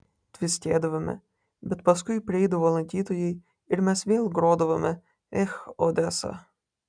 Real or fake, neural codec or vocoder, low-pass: real; none; 9.9 kHz